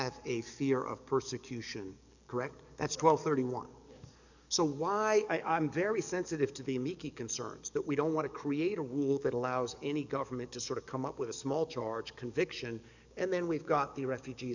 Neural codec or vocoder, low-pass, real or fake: codec, 44.1 kHz, 7.8 kbps, DAC; 7.2 kHz; fake